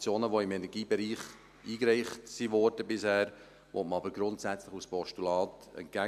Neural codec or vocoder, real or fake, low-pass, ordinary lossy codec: none; real; 14.4 kHz; none